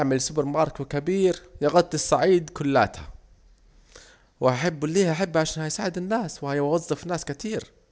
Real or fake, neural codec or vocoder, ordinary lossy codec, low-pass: real; none; none; none